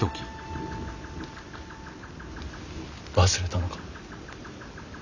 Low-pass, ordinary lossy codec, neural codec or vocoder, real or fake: 7.2 kHz; Opus, 64 kbps; none; real